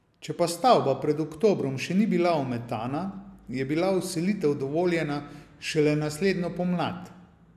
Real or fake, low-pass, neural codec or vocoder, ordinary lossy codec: real; 14.4 kHz; none; AAC, 96 kbps